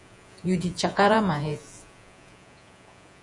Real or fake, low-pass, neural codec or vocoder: fake; 10.8 kHz; vocoder, 48 kHz, 128 mel bands, Vocos